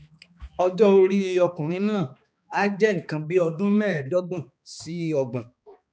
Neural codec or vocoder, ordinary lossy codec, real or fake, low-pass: codec, 16 kHz, 2 kbps, X-Codec, HuBERT features, trained on balanced general audio; none; fake; none